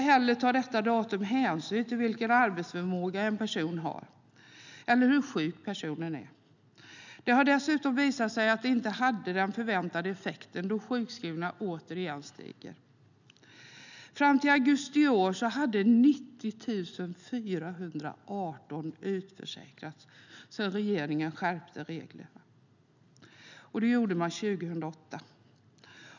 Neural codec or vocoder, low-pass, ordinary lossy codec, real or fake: none; 7.2 kHz; none; real